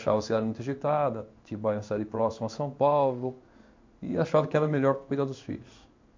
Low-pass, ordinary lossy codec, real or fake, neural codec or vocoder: 7.2 kHz; MP3, 48 kbps; fake; codec, 16 kHz in and 24 kHz out, 1 kbps, XY-Tokenizer